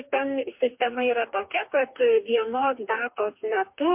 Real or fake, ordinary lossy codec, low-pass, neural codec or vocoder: fake; MP3, 32 kbps; 3.6 kHz; codec, 44.1 kHz, 2.6 kbps, DAC